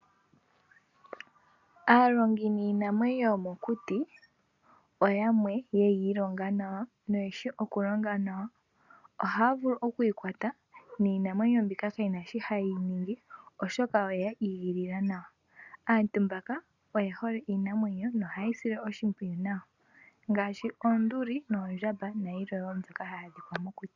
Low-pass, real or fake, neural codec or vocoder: 7.2 kHz; real; none